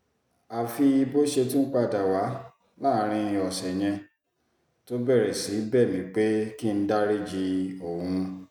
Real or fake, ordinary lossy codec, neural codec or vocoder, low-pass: real; none; none; 19.8 kHz